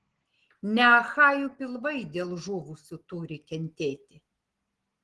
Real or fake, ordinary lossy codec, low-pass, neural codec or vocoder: real; Opus, 16 kbps; 10.8 kHz; none